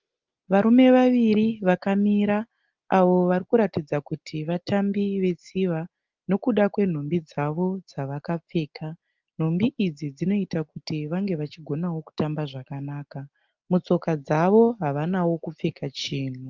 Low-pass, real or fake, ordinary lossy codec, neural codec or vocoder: 7.2 kHz; real; Opus, 24 kbps; none